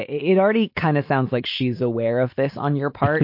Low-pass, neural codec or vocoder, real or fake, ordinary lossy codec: 5.4 kHz; none; real; MP3, 32 kbps